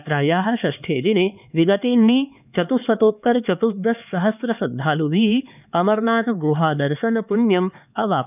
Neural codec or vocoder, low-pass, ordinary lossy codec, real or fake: codec, 16 kHz, 4 kbps, X-Codec, HuBERT features, trained on LibriSpeech; 3.6 kHz; none; fake